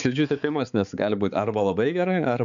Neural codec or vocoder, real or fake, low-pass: codec, 16 kHz, 4 kbps, X-Codec, HuBERT features, trained on balanced general audio; fake; 7.2 kHz